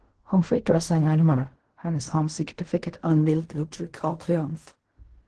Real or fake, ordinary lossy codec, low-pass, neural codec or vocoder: fake; Opus, 16 kbps; 10.8 kHz; codec, 16 kHz in and 24 kHz out, 0.4 kbps, LongCat-Audio-Codec, fine tuned four codebook decoder